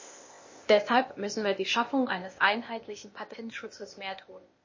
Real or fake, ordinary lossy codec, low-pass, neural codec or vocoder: fake; MP3, 32 kbps; 7.2 kHz; codec, 16 kHz, 0.8 kbps, ZipCodec